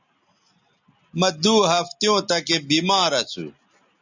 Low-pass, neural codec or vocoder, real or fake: 7.2 kHz; none; real